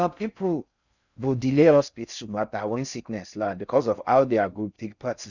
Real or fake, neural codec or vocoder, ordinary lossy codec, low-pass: fake; codec, 16 kHz in and 24 kHz out, 0.6 kbps, FocalCodec, streaming, 4096 codes; none; 7.2 kHz